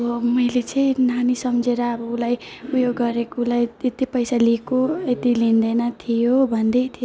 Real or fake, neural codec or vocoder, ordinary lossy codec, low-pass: real; none; none; none